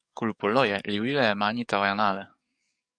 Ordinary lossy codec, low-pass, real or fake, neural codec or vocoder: MP3, 96 kbps; 9.9 kHz; fake; codec, 44.1 kHz, 7.8 kbps, DAC